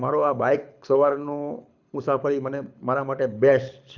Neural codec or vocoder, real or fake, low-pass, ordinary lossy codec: codec, 24 kHz, 6 kbps, HILCodec; fake; 7.2 kHz; none